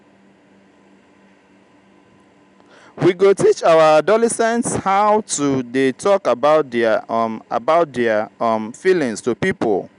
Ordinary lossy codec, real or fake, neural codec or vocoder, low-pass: none; real; none; 10.8 kHz